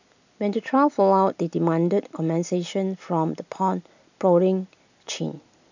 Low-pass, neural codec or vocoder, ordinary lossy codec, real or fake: 7.2 kHz; none; none; real